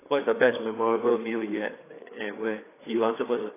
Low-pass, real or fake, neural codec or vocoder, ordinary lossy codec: 3.6 kHz; fake; codec, 16 kHz, 4 kbps, FreqCodec, larger model; AAC, 16 kbps